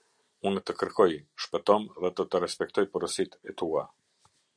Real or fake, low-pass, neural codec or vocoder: real; 9.9 kHz; none